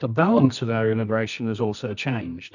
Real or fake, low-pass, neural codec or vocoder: fake; 7.2 kHz; codec, 24 kHz, 0.9 kbps, WavTokenizer, medium music audio release